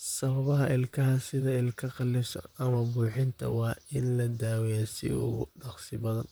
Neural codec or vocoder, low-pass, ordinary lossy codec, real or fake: vocoder, 44.1 kHz, 128 mel bands, Pupu-Vocoder; none; none; fake